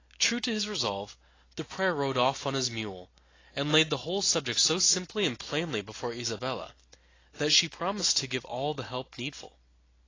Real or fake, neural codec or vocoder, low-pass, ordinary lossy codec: real; none; 7.2 kHz; AAC, 32 kbps